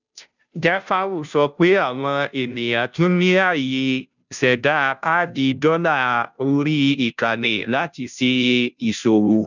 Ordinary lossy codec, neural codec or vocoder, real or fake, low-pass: none; codec, 16 kHz, 0.5 kbps, FunCodec, trained on Chinese and English, 25 frames a second; fake; 7.2 kHz